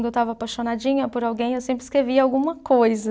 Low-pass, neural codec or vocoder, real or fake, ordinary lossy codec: none; none; real; none